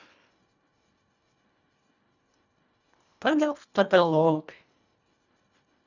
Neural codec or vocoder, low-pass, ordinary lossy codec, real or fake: codec, 24 kHz, 1.5 kbps, HILCodec; 7.2 kHz; none; fake